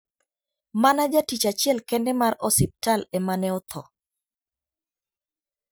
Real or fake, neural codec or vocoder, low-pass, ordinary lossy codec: real; none; none; none